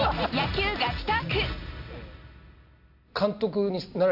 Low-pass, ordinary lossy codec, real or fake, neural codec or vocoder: 5.4 kHz; none; real; none